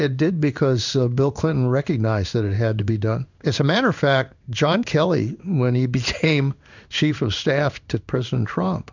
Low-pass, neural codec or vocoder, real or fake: 7.2 kHz; codec, 16 kHz in and 24 kHz out, 1 kbps, XY-Tokenizer; fake